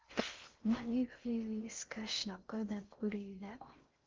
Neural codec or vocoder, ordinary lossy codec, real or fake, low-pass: codec, 16 kHz in and 24 kHz out, 0.6 kbps, FocalCodec, streaming, 4096 codes; Opus, 32 kbps; fake; 7.2 kHz